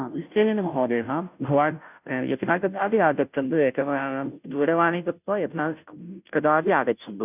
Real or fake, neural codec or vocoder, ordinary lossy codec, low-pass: fake; codec, 16 kHz, 0.5 kbps, FunCodec, trained on Chinese and English, 25 frames a second; none; 3.6 kHz